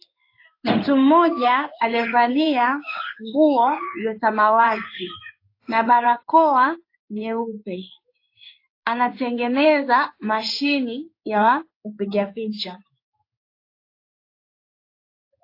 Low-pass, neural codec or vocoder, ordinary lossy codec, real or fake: 5.4 kHz; codec, 16 kHz in and 24 kHz out, 1 kbps, XY-Tokenizer; AAC, 32 kbps; fake